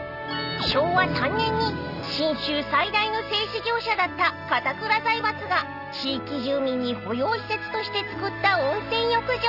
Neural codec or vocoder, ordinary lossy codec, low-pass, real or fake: none; none; 5.4 kHz; real